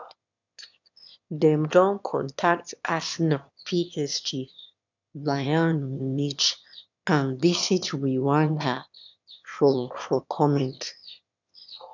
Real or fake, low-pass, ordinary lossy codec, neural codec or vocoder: fake; 7.2 kHz; none; autoencoder, 22.05 kHz, a latent of 192 numbers a frame, VITS, trained on one speaker